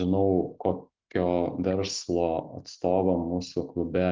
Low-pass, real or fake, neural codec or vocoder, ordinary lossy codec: 7.2 kHz; real; none; Opus, 32 kbps